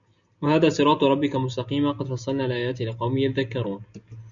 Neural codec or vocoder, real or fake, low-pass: none; real; 7.2 kHz